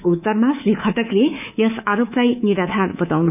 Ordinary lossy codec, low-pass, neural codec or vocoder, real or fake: none; 3.6 kHz; codec, 24 kHz, 3.1 kbps, DualCodec; fake